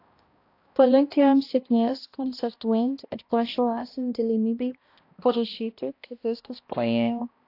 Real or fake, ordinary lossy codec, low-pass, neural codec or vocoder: fake; AAC, 32 kbps; 5.4 kHz; codec, 16 kHz, 1 kbps, X-Codec, HuBERT features, trained on balanced general audio